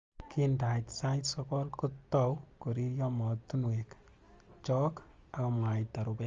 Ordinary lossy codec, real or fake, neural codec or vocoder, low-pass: Opus, 32 kbps; real; none; 7.2 kHz